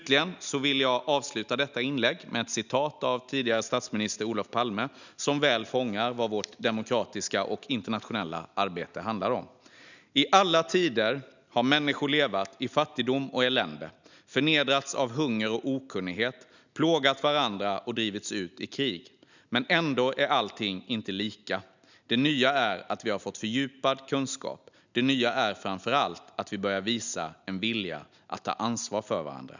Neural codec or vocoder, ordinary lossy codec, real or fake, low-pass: none; none; real; 7.2 kHz